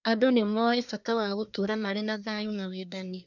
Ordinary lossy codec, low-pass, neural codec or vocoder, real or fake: none; 7.2 kHz; codec, 24 kHz, 1 kbps, SNAC; fake